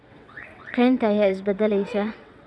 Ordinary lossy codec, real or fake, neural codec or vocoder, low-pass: none; fake; vocoder, 22.05 kHz, 80 mel bands, WaveNeXt; none